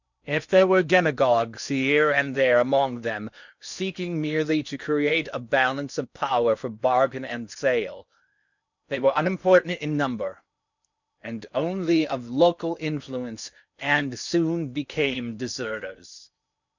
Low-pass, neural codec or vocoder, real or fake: 7.2 kHz; codec, 16 kHz in and 24 kHz out, 0.8 kbps, FocalCodec, streaming, 65536 codes; fake